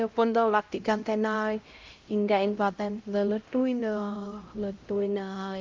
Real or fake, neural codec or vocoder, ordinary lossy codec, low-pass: fake; codec, 16 kHz, 0.5 kbps, X-Codec, HuBERT features, trained on LibriSpeech; Opus, 32 kbps; 7.2 kHz